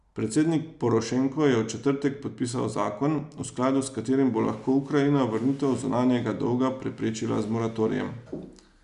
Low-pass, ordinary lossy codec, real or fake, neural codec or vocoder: 10.8 kHz; none; real; none